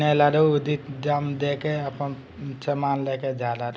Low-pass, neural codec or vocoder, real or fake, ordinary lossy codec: none; none; real; none